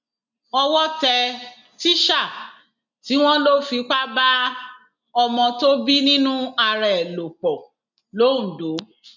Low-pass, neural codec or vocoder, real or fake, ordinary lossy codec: 7.2 kHz; none; real; none